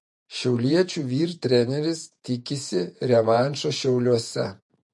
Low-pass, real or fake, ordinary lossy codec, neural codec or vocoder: 10.8 kHz; fake; MP3, 48 kbps; vocoder, 48 kHz, 128 mel bands, Vocos